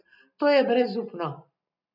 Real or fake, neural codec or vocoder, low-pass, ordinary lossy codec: real; none; 5.4 kHz; none